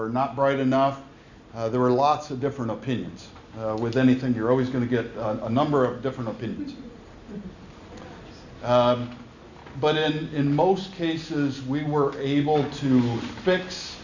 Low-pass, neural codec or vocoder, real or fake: 7.2 kHz; none; real